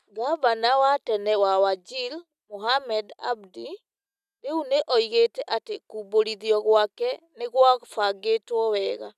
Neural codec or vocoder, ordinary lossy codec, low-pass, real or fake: none; none; 14.4 kHz; real